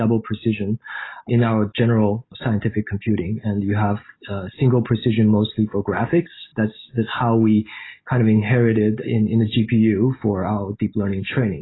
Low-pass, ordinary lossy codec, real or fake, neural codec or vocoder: 7.2 kHz; AAC, 16 kbps; real; none